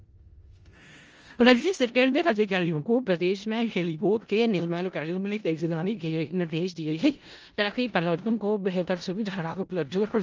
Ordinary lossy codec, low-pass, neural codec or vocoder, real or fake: Opus, 24 kbps; 7.2 kHz; codec, 16 kHz in and 24 kHz out, 0.4 kbps, LongCat-Audio-Codec, four codebook decoder; fake